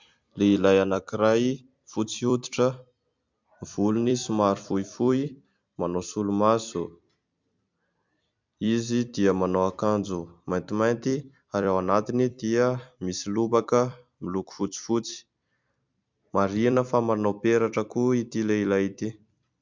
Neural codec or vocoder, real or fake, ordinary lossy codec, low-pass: vocoder, 44.1 kHz, 128 mel bands every 256 samples, BigVGAN v2; fake; MP3, 64 kbps; 7.2 kHz